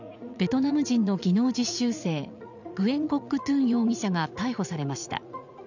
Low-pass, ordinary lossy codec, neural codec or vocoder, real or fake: 7.2 kHz; none; vocoder, 44.1 kHz, 80 mel bands, Vocos; fake